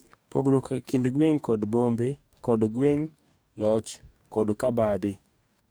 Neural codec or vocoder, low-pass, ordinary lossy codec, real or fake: codec, 44.1 kHz, 2.6 kbps, DAC; none; none; fake